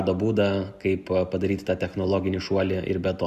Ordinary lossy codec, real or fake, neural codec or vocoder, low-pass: Opus, 64 kbps; real; none; 14.4 kHz